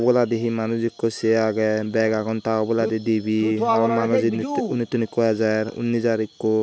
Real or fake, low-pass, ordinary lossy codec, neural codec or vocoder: real; none; none; none